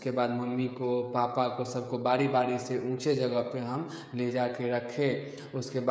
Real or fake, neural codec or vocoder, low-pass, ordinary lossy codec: fake; codec, 16 kHz, 16 kbps, FreqCodec, smaller model; none; none